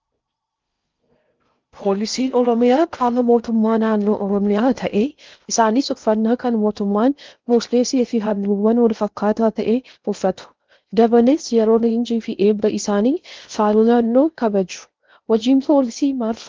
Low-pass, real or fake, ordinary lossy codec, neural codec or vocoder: 7.2 kHz; fake; Opus, 32 kbps; codec, 16 kHz in and 24 kHz out, 0.6 kbps, FocalCodec, streaming, 4096 codes